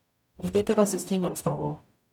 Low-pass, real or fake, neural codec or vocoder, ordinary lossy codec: 19.8 kHz; fake; codec, 44.1 kHz, 0.9 kbps, DAC; none